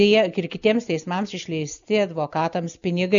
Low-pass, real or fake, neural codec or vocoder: 7.2 kHz; real; none